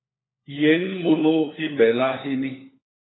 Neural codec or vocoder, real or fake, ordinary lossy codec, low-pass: codec, 16 kHz, 4 kbps, FunCodec, trained on LibriTTS, 50 frames a second; fake; AAC, 16 kbps; 7.2 kHz